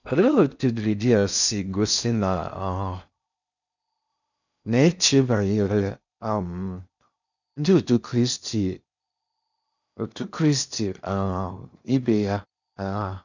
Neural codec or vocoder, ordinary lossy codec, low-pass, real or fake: codec, 16 kHz in and 24 kHz out, 0.6 kbps, FocalCodec, streaming, 4096 codes; none; 7.2 kHz; fake